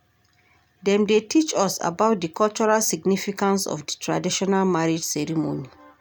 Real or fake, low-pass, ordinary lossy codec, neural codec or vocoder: real; none; none; none